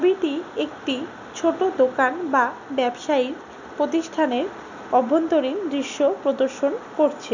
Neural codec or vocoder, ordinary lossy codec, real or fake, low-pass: none; none; real; 7.2 kHz